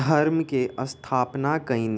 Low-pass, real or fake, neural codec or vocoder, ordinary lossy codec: none; real; none; none